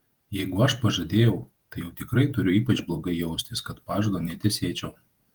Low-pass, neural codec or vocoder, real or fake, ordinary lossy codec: 19.8 kHz; vocoder, 48 kHz, 128 mel bands, Vocos; fake; Opus, 24 kbps